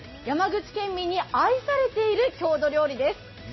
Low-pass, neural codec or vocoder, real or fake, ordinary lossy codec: 7.2 kHz; none; real; MP3, 24 kbps